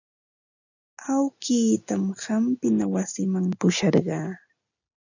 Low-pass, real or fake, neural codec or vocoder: 7.2 kHz; real; none